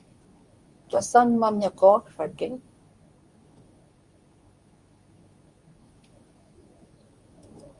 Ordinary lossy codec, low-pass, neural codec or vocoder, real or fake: Opus, 64 kbps; 10.8 kHz; codec, 24 kHz, 0.9 kbps, WavTokenizer, medium speech release version 2; fake